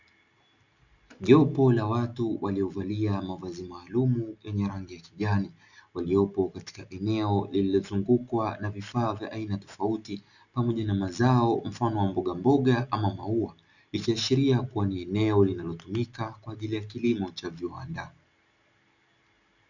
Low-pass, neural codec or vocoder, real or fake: 7.2 kHz; none; real